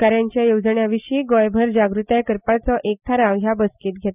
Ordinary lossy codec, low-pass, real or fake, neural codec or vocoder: none; 3.6 kHz; real; none